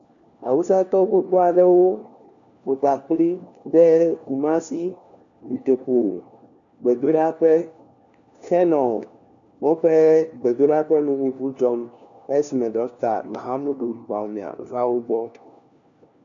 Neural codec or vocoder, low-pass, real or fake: codec, 16 kHz, 1 kbps, FunCodec, trained on LibriTTS, 50 frames a second; 7.2 kHz; fake